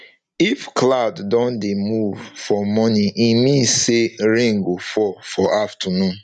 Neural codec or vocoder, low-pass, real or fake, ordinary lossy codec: none; 10.8 kHz; real; none